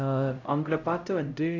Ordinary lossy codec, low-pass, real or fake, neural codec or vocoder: none; 7.2 kHz; fake; codec, 16 kHz, 0.5 kbps, X-Codec, HuBERT features, trained on LibriSpeech